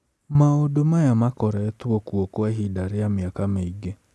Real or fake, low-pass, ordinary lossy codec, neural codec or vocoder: real; none; none; none